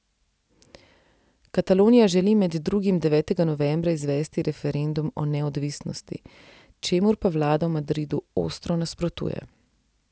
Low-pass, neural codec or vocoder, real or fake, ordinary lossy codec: none; none; real; none